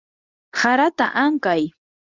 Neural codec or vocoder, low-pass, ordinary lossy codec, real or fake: codec, 24 kHz, 0.9 kbps, WavTokenizer, medium speech release version 2; 7.2 kHz; Opus, 64 kbps; fake